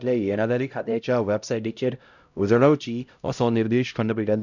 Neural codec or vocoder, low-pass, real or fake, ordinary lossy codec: codec, 16 kHz, 0.5 kbps, X-Codec, HuBERT features, trained on LibriSpeech; 7.2 kHz; fake; none